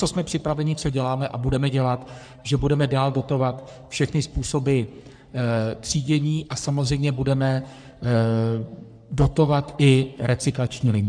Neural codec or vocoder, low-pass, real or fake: codec, 44.1 kHz, 3.4 kbps, Pupu-Codec; 9.9 kHz; fake